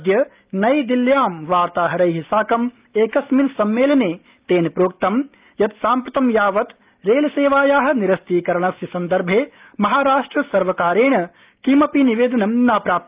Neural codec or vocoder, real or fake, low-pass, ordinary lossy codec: none; real; 3.6 kHz; Opus, 24 kbps